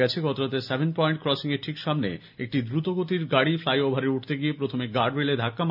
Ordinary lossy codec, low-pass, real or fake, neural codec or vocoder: none; 5.4 kHz; real; none